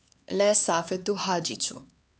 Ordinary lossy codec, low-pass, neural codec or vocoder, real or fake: none; none; codec, 16 kHz, 4 kbps, X-Codec, HuBERT features, trained on LibriSpeech; fake